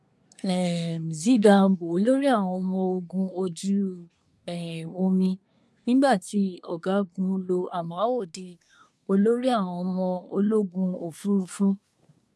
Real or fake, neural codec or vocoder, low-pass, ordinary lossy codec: fake; codec, 24 kHz, 1 kbps, SNAC; none; none